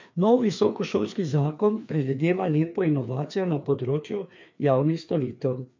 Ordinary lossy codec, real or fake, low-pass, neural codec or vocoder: MP3, 48 kbps; fake; 7.2 kHz; codec, 16 kHz, 2 kbps, FreqCodec, larger model